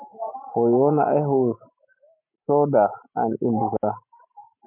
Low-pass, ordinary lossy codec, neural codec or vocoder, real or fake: 3.6 kHz; MP3, 32 kbps; none; real